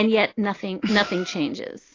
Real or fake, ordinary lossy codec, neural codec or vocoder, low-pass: real; AAC, 32 kbps; none; 7.2 kHz